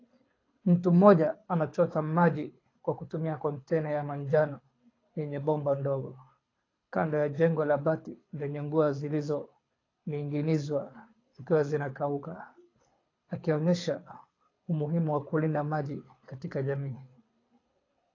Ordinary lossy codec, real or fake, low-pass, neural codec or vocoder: AAC, 32 kbps; fake; 7.2 kHz; codec, 24 kHz, 6 kbps, HILCodec